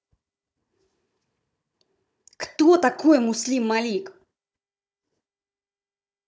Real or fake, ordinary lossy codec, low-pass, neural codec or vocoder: fake; none; none; codec, 16 kHz, 16 kbps, FunCodec, trained on Chinese and English, 50 frames a second